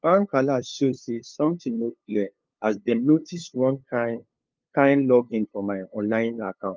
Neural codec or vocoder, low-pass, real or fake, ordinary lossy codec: codec, 16 kHz, 2 kbps, FunCodec, trained on LibriTTS, 25 frames a second; 7.2 kHz; fake; Opus, 24 kbps